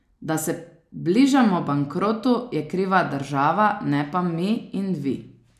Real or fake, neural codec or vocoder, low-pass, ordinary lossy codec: real; none; 14.4 kHz; none